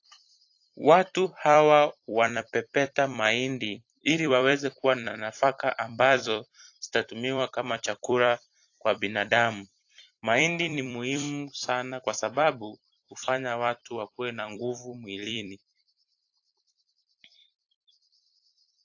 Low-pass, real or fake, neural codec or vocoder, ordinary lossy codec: 7.2 kHz; fake; vocoder, 44.1 kHz, 128 mel bands every 256 samples, BigVGAN v2; AAC, 48 kbps